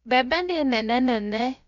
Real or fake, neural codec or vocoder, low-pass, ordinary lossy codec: fake; codec, 16 kHz, about 1 kbps, DyCAST, with the encoder's durations; 7.2 kHz; none